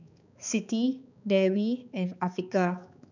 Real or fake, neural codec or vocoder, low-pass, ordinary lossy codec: fake; codec, 16 kHz, 4 kbps, X-Codec, HuBERT features, trained on balanced general audio; 7.2 kHz; none